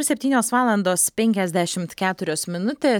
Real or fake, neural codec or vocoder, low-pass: real; none; 19.8 kHz